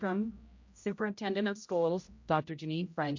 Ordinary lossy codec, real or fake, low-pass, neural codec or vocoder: MP3, 48 kbps; fake; 7.2 kHz; codec, 16 kHz, 0.5 kbps, X-Codec, HuBERT features, trained on general audio